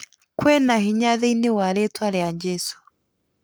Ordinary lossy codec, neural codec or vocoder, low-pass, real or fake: none; codec, 44.1 kHz, 7.8 kbps, Pupu-Codec; none; fake